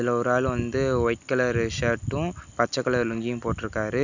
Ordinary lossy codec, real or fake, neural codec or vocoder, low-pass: none; real; none; 7.2 kHz